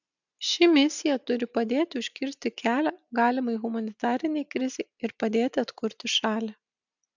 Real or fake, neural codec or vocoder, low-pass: real; none; 7.2 kHz